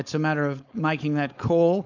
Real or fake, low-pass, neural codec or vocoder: fake; 7.2 kHz; codec, 16 kHz, 4.8 kbps, FACodec